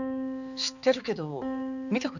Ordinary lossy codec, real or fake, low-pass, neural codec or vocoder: none; fake; 7.2 kHz; codec, 16 kHz, 4 kbps, X-Codec, HuBERT features, trained on balanced general audio